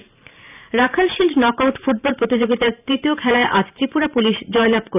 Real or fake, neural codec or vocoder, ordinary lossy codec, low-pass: fake; vocoder, 44.1 kHz, 128 mel bands every 512 samples, BigVGAN v2; none; 3.6 kHz